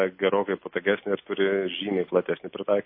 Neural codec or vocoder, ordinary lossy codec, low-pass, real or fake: none; MP3, 24 kbps; 5.4 kHz; real